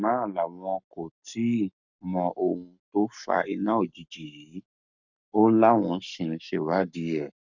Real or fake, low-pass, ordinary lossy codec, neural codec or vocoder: fake; 7.2 kHz; none; codec, 44.1 kHz, 7.8 kbps, Pupu-Codec